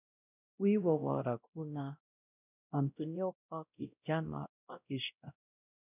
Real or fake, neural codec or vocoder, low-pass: fake; codec, 16 kHz, 0.5 kbps, X-Codec, WavLM features, trained on Multilingual LibriSpeech; 3.6 kHz